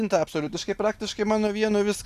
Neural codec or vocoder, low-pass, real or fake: none; 14.4 kHz; real